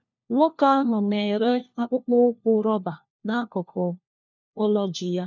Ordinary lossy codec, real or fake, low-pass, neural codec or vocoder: none; fake; 7.2 kHz; codec, 16 kHz, 1 kbps, FunCodec, trained on LibriTTS, 50 frames a second